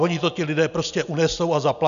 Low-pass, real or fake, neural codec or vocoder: 7.2 kHz; real; none